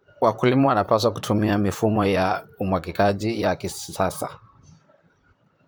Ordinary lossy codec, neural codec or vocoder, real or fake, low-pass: none; vocoder, 44.1 kHz, 128 mel bands, Pupu-Vocoder; fake; none